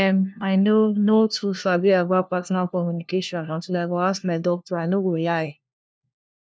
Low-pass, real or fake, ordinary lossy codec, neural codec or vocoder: none; fake; none; codec, 16 kHz, 1 kbps, FunCodec, trained on LibriTTS, 50 frames a second